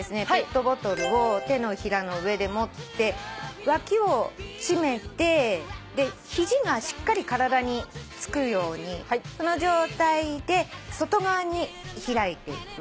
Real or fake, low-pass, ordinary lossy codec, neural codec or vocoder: real; none; none; none